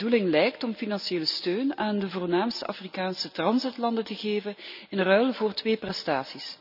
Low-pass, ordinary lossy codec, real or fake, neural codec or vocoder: 5.4 kHz; none; real; none